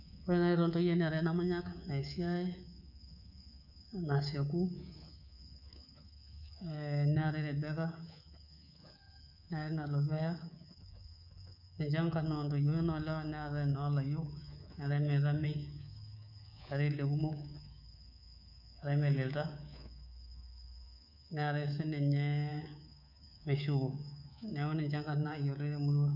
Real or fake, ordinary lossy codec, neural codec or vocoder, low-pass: fake; none; codec, 24 kHz, 3.1 kbps, DualCodec; 5.4 kHz